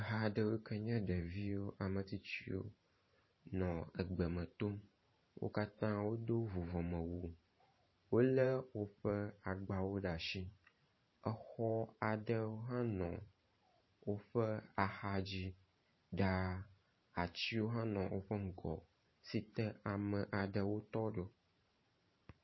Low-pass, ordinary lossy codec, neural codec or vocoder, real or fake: 7.2 kHz; MP3, 24 kbps; vocoder, 44.1 kHz, 128 mel bands every 512 samples, BigVGAN v2; fake